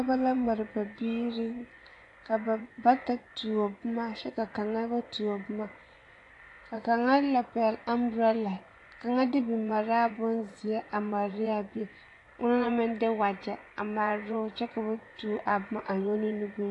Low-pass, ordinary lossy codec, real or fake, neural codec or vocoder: 10.8 kHz; AAC, 48 kbps; fake; vocoder, 24 kHz, 100 mel bands, Vocos